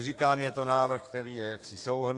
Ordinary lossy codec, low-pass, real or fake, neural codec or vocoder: AAC, 48 kbps; 10.8 kHz; fake; codec, 32 kHz, 1.9 kbps, SNAC